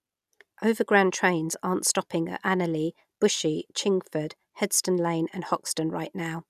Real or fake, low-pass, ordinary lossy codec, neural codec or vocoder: real; 14.4 kHz; none; none